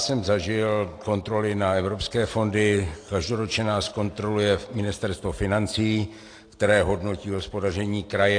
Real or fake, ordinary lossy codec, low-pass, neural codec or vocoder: real; AAC, 48 kbps; 9.9 kHz; none